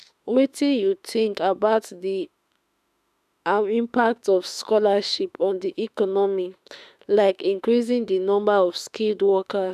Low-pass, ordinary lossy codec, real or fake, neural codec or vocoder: 14.4 kHz; none; fake; autoencoder, 48 kHz, 32 numbers a frame, DAC-VAE, trained on Japanese speech